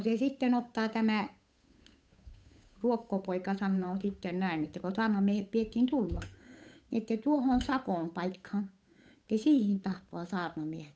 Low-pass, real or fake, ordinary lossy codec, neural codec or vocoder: none; fake; none; codec, 16 kHz, 8 kbps, FunCodec, trained on Chinese and English, 25 frames a second